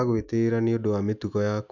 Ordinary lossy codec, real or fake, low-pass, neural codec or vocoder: none; real; 7.2 kHz; none